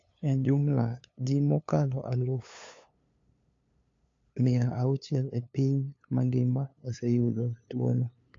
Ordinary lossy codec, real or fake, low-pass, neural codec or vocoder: AAC, 48 kbps; fake; 7.2 kHz; codec, 16 kHz, 2 kbps, FunCodec, trained on LibriTTS, 25 frames a second